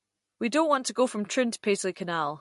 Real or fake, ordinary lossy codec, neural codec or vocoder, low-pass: real; MP3, 48 kbps; none; 14.4 kHz